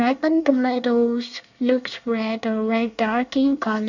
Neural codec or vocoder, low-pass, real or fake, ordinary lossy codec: codec, 24 kHz, 1 kbps, SNAC; 7.2 kHz; fake; none